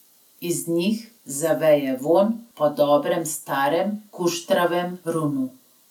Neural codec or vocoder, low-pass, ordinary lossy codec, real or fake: none; 19.8 kHz; none; real